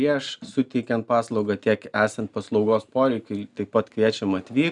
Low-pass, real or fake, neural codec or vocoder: 10.8 kHz; real; none